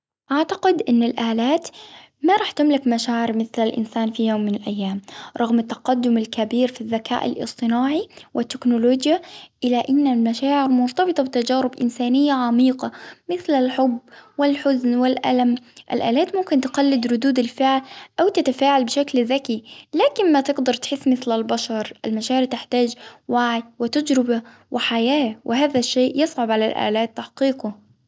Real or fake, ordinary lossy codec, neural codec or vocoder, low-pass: real; none; none; none